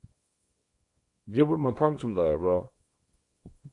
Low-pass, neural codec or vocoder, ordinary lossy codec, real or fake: 10.8 kHz; codec, 24 kHz, 0.9 kbps, WavTokenizer, small release; AAC, 48 kbps; fake